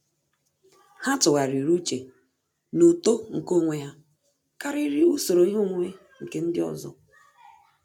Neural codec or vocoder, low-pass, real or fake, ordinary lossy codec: none; 19.8 kHz; real; MP3, 96 kbps